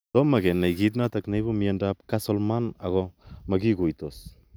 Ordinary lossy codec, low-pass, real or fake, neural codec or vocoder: none; none; real; none